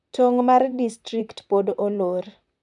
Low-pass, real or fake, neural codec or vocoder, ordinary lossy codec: 10.8 kHz; fake; vocoder, 44.1 kHz, 128 mel bands, Pupu-Vocoder; none